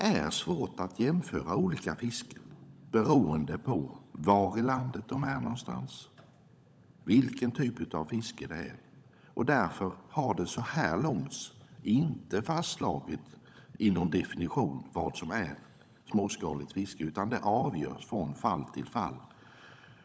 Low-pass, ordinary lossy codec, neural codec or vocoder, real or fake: none; none; codec, 16 kHz, 16 kbps, FunCodec, trained on LibriTTS, 50 frames a second; fake